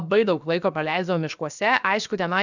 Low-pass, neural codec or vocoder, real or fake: 7.2 kHz; codec, 16 kHz, 0.7 kbps, FocalCodec; fake